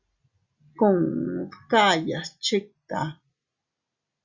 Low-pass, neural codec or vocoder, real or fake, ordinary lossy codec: 7.2 kHz; none; real; Opus, 64 kbps